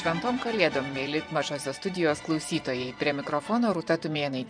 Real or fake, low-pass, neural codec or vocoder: fake; 9.9 kHz; vocoder, 24 kHz, 100 mel bands, Vocos